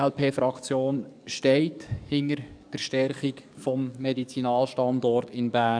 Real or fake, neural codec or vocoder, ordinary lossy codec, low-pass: fake; codec, 44.1 kHz, 7.8 kbps, DAC; none; 9.9 kHz